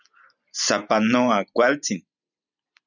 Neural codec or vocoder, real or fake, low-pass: vocoder, 44.1 kHz, 128 mel bands every 256 samples, BigVGAN v2; fake; 7.2 kHz